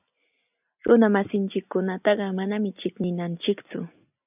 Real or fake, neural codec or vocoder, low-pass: real; none; 3.6 kHz